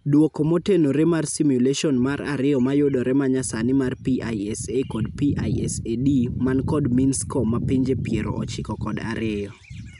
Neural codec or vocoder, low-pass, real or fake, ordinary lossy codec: none; 10.8 kHz; real; none